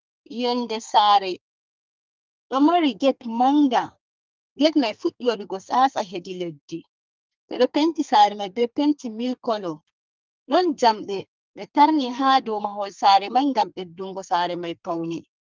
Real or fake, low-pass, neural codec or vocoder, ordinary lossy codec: fake; 7.2 kHz; codec, 32 kHz, 1.9 kbps, SNAC; Opus, 24 kbps